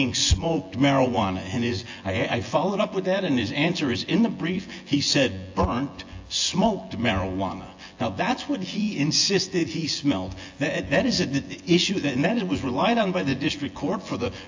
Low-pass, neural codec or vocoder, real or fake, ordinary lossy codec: 7.2 kHz; vocoder, 24 kHz, 100 mel bands, Vocos; fake; AAC, 48 kbps